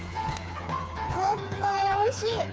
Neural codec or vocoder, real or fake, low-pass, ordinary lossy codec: codec, 16 kHz, 4 kbps, FreqCodec, smaller model; fake; none; none